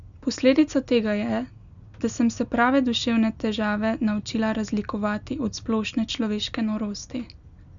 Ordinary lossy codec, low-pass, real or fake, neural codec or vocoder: none; 7.2 kHz; real; none